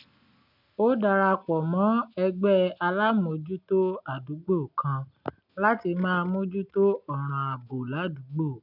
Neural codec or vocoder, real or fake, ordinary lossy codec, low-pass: none; real; none; 5.4 kHz